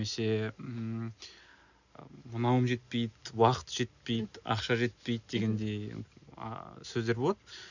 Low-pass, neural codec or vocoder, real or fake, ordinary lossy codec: 7.2 kHz; none; real; none